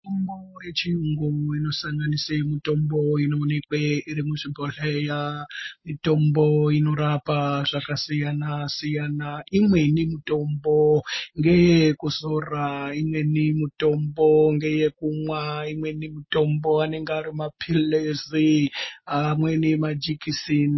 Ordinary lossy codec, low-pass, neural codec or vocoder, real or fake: MP3, 24 kbps; 7.2 kHz; none; real